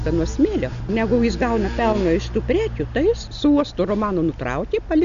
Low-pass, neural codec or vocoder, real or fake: 7.2 kHz; none; real